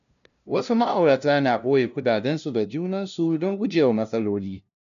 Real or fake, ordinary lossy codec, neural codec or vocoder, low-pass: fake; none; codec, 16 kHz, 0.5 kbps, FunCodec, trained on LibriTTS, 25 frames a second; 7.2 kHz